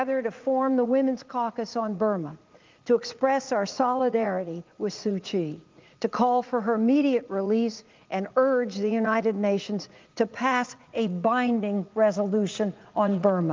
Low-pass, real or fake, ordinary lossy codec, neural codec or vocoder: 7.2 kHz; fake; Opus, 32 kbps; vocoder, 44.1 kHz, 80 mel bands, Vocos